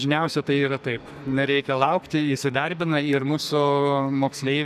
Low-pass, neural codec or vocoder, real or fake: 14.4 kHz; codec, 44.1 kHz, 2.6 kbps, SNAC; fake